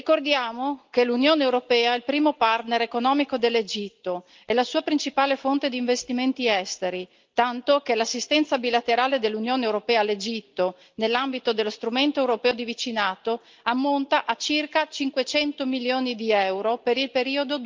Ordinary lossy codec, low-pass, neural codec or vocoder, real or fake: Opus, 24 kbps; 7.2 kHz; none; real